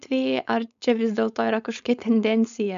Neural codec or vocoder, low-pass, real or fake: codec, 16 kHz, 4.8 kbps, FACodec; 7.2 kHz; fake